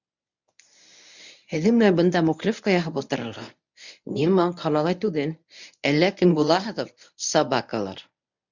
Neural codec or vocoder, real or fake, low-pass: codec, 24 kHz, 0.9 kbps, WavTokenizer, medium speech release version 1; fake; 7.2 kHz